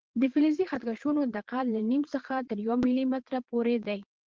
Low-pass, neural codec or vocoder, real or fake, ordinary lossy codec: 7.2 kHz; vocoder, 22.05 kHz, 80 mel bands, Vocos; fake; Opus, 16 kbps